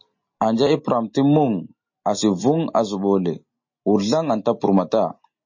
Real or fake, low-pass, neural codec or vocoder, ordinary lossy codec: real; 7.2 kHz; none; MP3, 32 kbps